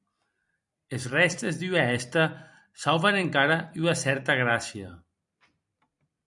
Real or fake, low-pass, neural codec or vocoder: fake; 10.8 kHz; vocoder, 44.1 kHz, 128 mel bands every 256 samples, BigVGAN v2